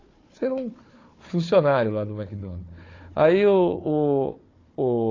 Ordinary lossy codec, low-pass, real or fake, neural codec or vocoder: AAC, 32 kbps; 7.2 kHz; fake; codec, 16 kHz, 4 kbps, FunCodec, trained on Chinese and English, 50 frames a second